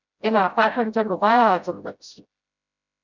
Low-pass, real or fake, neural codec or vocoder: 7.2 kHz; fake; codec, 16 kHz, 0.5 kbps, FreqCodec, smaller model